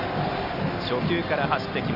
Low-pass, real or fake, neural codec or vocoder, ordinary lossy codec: 5.4 kHz; real; none; none